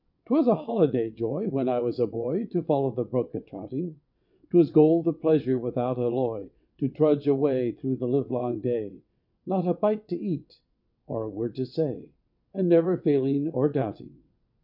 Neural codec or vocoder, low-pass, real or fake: vocoder, 22.05 kHz, 80 mel bands, WaveNeXt; 5.4 kHz; fake